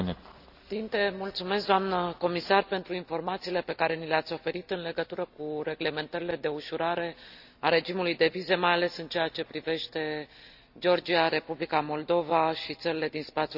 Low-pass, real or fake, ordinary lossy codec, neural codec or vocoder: 5.4 kHz; real; none; none